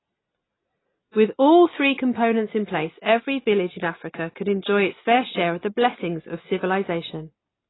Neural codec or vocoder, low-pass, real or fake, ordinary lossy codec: none; 7.2 kHz; real; AAC, 16 kbps